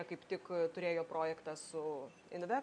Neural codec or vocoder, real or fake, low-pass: none; real; 9.9 kHz